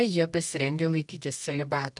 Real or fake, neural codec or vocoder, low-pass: fake; codec, 24 kHz, 0.9 kbps, WavTokenizer, medium music audio release; 10.8 kHz